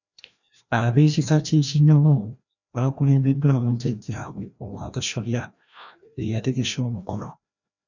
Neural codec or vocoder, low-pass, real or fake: codec, 16 kHz, 1 kbps, FreqCodec, larger model; 7.2 kHz; fake